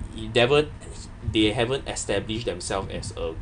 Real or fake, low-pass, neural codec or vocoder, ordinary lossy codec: real; 9.9 kHz; none; none